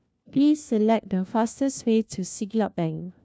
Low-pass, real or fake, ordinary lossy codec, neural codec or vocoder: none; fake; none; codec, 16 kHz, 1 kbps, FunCodec, trained on LibriTTS, 50 frames a second